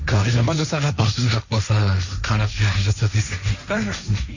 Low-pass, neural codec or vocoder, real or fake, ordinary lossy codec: 7.2 kHz; codec, 16 kHz, 1.1 kbps, Voila-Tokenizer; fake; none